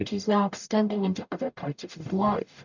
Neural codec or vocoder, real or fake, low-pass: codec, 44.1 kHz, 0.9 kbps, DAC; fake; 7.2 kHz